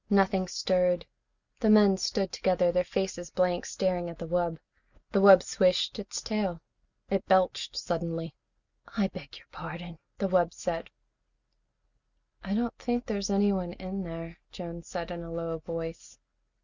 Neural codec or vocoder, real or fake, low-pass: none; real; 7.2 kHz